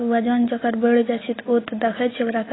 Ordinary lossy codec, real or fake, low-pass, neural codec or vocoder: AAC, 16 kbps; real; 7.2 kHz; none